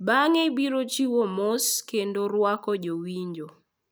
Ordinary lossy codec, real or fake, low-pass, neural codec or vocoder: none; real; none; none